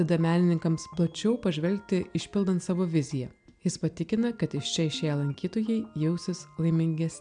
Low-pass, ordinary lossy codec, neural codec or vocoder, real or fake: 9.9 kHz; MP3, 96 kbps; none; real